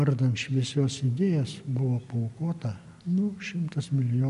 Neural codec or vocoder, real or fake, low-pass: none; real; 10.8 kHz